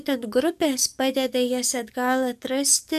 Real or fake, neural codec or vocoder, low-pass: fake; codec, 44.1 kHz, 7.8 kbps, DAC; 14.4 kHz